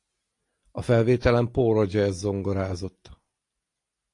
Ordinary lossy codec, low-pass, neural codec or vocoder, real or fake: AAC, 48 kbps; 10.8 kHz; none; real